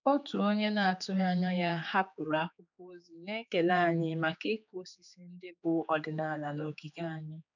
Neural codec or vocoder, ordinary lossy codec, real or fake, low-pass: codec, 16 kHz, 4 kbps, X-Codec, HuBERT features, trained on general audio; none; fake; 7.2 kHz